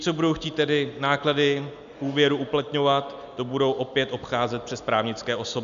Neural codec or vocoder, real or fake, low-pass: none; real; 7.2 kHz